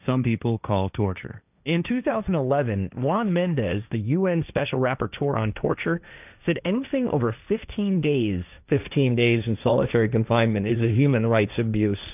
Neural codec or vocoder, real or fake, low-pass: codec, 16 kHz, 1.1 kbps, Voila-Tokenizer; fake; 3.6 kHz